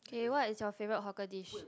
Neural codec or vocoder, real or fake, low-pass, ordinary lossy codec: none; real; none; none